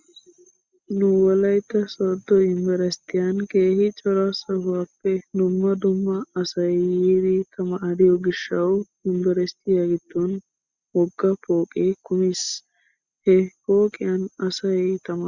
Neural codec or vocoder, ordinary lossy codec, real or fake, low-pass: none; Opus, 64 kbps; real; 7.2 kHz